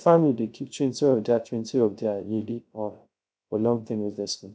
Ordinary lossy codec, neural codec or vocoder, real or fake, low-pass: none; codec, 16 kHz, 0.3 kbps, FocalCodec; fake; none